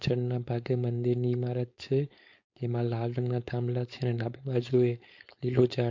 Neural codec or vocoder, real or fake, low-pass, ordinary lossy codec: codec, 16 kHz, 4.8 kbps, FACodec; fake; 7.2 kHz; MP3, 48 kbps